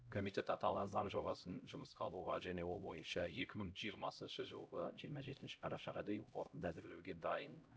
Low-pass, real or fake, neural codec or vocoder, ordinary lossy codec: none; fake; codec, 16 kHz, 0.5 kbps, X-Codec, HuBERT features, trained on LibriSpeech; none